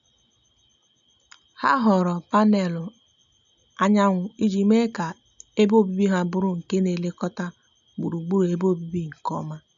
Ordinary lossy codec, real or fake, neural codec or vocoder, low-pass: none; real; none; 7.2 kHz